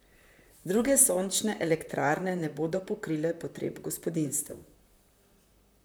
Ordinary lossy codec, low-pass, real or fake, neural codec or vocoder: none; none; fake; vocoder, 44.1 kHz, 128 mel bands, Pupu-Vocoder